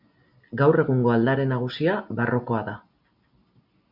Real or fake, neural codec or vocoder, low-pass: real; none; 5.4 kHz